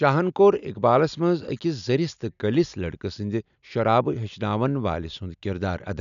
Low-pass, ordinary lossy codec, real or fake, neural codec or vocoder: 7.2 kHz; none; real; none